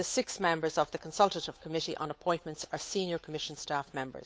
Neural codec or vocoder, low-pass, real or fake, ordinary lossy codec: codec, 16 kHz, 8 kbps, FunCodec, trained on Chinese and English, 25 frames a second; none; fake; none